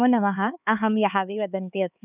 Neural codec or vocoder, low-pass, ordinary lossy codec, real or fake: codec, 16 kHz, 4 kbps, X-Codec, HuBERT features, trained on LibriSpeech; 3.6 kHz; none; fake